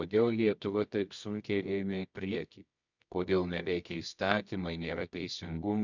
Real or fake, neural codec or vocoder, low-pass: fake; codec, 24 kHz, 0.9 kbps, WavTokenizer, medium music audio release; 7.2 kHz